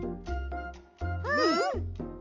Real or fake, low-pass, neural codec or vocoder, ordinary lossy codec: real; 7.2 kHz; none; none